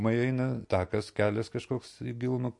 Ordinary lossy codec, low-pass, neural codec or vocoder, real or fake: MP3, 48 kbps; 10.8 kHz; none; real